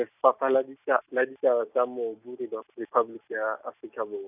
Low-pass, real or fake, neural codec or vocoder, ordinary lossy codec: 3.6 kHz; real; none; none